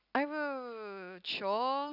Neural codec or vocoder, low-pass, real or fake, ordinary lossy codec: none; 5.4 kHz; real; none